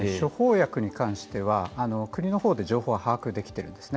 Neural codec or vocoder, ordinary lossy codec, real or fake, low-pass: none; none; real; none